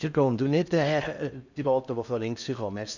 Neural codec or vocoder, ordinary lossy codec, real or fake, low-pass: codec, 16 kHz in and 24 kHz out, 0.6 kbps, FocalCodec, streaming, 4096 codes; none; fake; 7.2 kHz